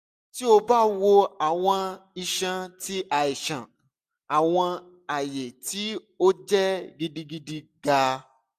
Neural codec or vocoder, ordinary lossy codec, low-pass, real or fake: none; AAC, 96 kbps; 14.4 kHz; real